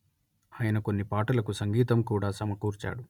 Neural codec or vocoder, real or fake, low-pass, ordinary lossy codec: none; real; 19.8 kHz; none